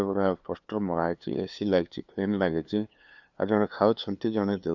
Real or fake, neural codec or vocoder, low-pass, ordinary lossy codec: fake; codec, 16 kHz, 2 kbps, FunCodec, trained on LibriTTS, 25 frames a second; 7.2 kHz; none